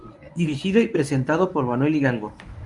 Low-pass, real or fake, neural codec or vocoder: 10.8 kHz; fake; codec, 24 kHz, 0.9 kbps, WavTokenizer, medium speech release version 1